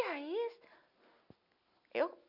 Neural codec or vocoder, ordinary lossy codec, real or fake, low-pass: none; none; real; 5.4 kHz